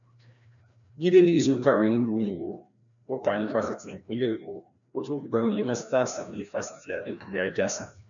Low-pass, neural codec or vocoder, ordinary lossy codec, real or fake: 7.2 kHz; codec, 16 kHz, 1 kbps, FreqCodec, larger model; none; fake